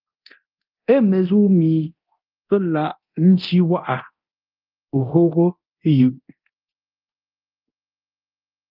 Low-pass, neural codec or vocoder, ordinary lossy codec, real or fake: 5.4 kHz; codec, 24 kHz, 0.9 kbps, DualCodec; Opus, 24 kbps; fake